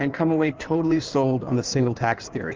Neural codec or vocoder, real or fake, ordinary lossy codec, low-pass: codec, 16 kHz in and 24 kHz out, 1.1 kbps, FireRedTTS-2 codec; fake; Opus, 24 kbps; 7.2 kHz